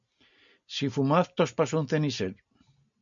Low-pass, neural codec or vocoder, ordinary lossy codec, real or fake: 7.2 kHz; none; MP3, 96 kbps; real